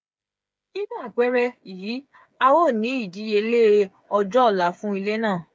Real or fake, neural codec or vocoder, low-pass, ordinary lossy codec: fake; codec, 16 kHz, 8 kbps, FreqCodec, smaller model; none; none